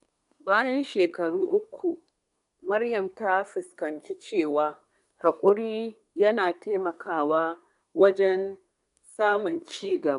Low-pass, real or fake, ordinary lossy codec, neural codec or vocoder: 10.8 kHz; fake; none; codec, 24 kHz, 1 kbps, SNAC